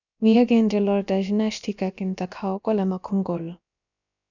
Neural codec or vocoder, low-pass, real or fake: codec, 16 kHz, about 1 kbps, DyCAST, with the encoder's durations; 7.2 kHz; fake